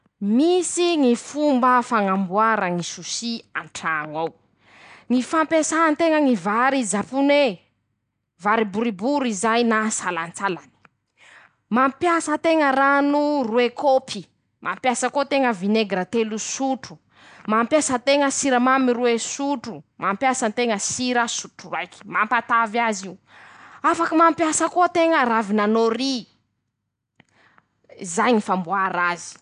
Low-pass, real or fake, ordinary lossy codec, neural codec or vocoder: 9.9 kHz; real; none; none